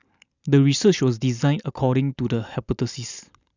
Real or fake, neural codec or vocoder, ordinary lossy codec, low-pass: real; none; none; 7.2 kHz